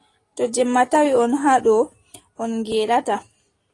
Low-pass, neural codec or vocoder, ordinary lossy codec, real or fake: 10.8 kHz; none; AAC, 32 kbps; real